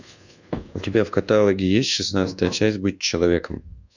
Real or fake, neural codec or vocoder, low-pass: fake; codec, 24 kHz, 1.2 kbps, DualCodec; 7.2 kHz